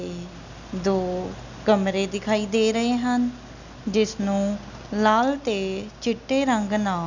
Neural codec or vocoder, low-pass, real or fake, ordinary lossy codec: none; 7.2 kHz; real; none